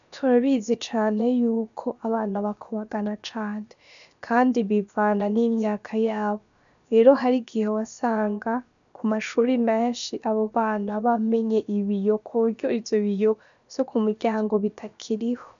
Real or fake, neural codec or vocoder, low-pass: fake; codec, 16 kHz, about 1 kbps, DyCAST, with the encoder's durations; 7.2 kHz